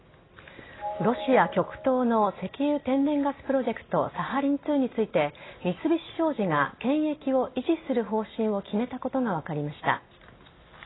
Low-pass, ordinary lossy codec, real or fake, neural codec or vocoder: 7.2 kHz; AAC, 16 kbps; real; none